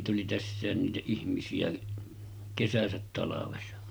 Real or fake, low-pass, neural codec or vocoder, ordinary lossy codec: real; none; none; none